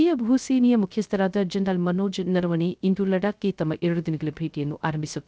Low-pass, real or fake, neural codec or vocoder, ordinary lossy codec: none; fake; codec, 16 kHz, 0.3 kbps, FocalCodec; none